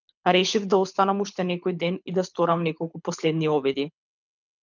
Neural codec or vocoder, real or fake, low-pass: codec, 24 kHz, 6 kbps, HILCodec; fake; 7.2 kHz